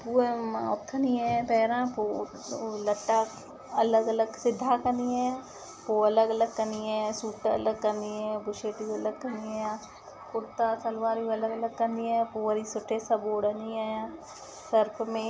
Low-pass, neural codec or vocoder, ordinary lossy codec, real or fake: none; none; none; real